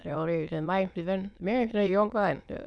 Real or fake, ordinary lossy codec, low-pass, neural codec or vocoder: fake; none; none; autoencoder, 22.05 kHz, a latent of 192 numbers a frame, VITS, trained on many speakers